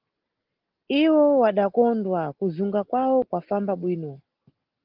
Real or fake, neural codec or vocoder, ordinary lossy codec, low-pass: real; none; Opus, 16 kbps; 5.4 kHz